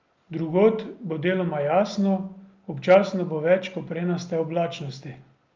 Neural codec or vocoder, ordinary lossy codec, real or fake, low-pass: none; Opus, 32 kbps; real; 7.2 kHz